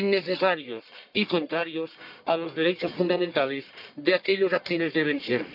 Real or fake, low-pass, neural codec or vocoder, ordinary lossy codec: fake; 5.4 kHz; codec, 44.1 kHz, 1.7 kbps, Pupu-Codec; none